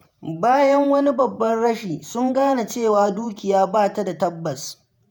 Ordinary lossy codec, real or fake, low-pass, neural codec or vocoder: none; fake; none; vocoder, 48 kHz, 128 mel bands, Vocos